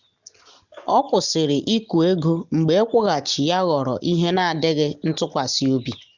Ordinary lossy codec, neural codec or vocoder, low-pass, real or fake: none; none; 7.2 kHz; real